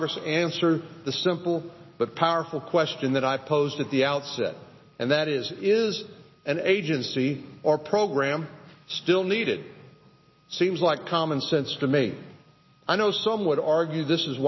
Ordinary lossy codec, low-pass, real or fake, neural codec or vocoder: MP3, 24 kbps; 7.2 kHz; real; none